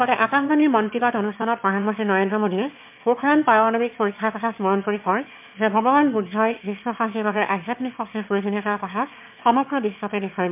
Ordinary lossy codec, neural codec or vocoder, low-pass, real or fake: MP3, 32 kbps; autoencoder, 22.05 kHz, a latent of 192 numbers a frame, VITS, trained on one speaker; 3.6 kHz; fake